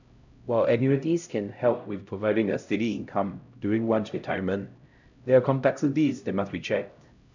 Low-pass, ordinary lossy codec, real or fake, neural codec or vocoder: 7.2 kHz; none; fake; codec, 16 kHz, 0.5 kbps, X-Codec, HuBERT features, trained on LibriSpeech